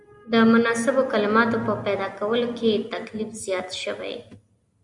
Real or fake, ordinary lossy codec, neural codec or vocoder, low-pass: real; Opus, 64 kbps; none; 10.8 kHz